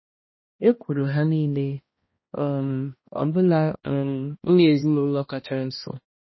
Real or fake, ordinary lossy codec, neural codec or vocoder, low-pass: fake; MP3, 24 kbps; codec, 16 kHz, 1 kbps, X-Codec, HuBERT features, trained on balanced general audio; 7.2 kHz